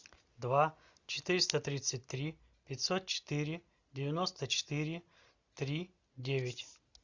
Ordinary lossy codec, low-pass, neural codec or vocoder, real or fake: Opus, 64 kbps; 7.2 kHz; none; real